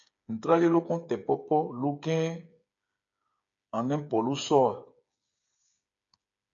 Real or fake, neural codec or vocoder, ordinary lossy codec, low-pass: fake; codec, 16 kHz, 8 kbps, FreqCodec, smaller model; MP3, 64 kbps; 7.2 kHz